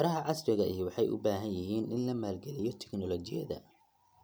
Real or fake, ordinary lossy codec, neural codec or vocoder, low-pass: real; none; none; none